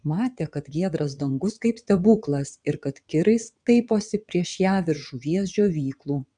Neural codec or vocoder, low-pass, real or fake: vocoder, 22.05 kHz, 80 mel bands, WaveNeXt; 9.9 kHz; fake